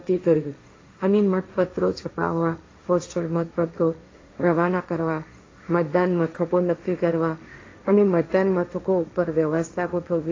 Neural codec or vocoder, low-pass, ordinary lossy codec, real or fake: codec, 16 kHz, 1.1 kbps, Voila-Tokenizer; 7.2 kHz; AAC, 32 kbps; fake